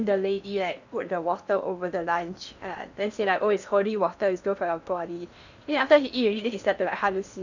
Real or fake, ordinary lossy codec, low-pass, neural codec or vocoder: fake; none; 7.2 kHz; codec, 16 kHz in and 24 kHz out, 0.8 kbps, FocalCodec, streaming, 65536 codes